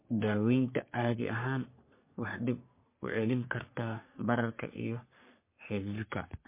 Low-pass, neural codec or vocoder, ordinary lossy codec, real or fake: 3.6 kHz; codec, 44.1 kHz, 3.4 kbps, Pupu-Codec; MP3, 24 kbps; fake